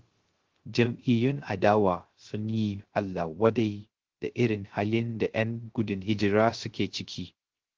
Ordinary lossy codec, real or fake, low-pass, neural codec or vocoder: Opus, 16 kbps; fake; 7.2 kHz; codec, 16 kHz, 0.3 kbps, FocalCodec